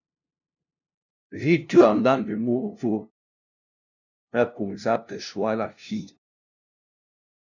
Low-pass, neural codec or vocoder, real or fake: 7.2 kHz; codec, 16 kHz, 0.5 kbps, FunCodec, trained on LibriTTS, 25 frames a second; fake